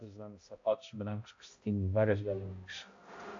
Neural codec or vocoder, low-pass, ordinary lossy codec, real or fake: codec, 16 kHz, 0.5 kbps, X-Codec, HuBERT features, trained on balanced general audio; 7.2 kHz; AAC, 48 kbps; fake